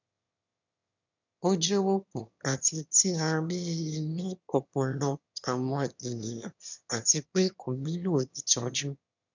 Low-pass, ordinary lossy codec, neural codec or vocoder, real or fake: 7.2 kHz; none; autoencoder, 22.05 kHz, a latent of 192 numbers a frame, VITS, trained on one speaker; fake